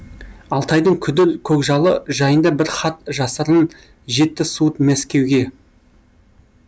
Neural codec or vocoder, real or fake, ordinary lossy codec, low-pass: none; real; none; none